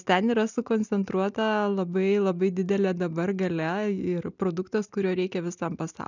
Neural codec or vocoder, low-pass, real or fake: none; 7.2 kHz; real